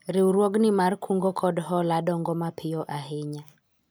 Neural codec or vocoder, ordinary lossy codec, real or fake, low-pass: none; none; real; none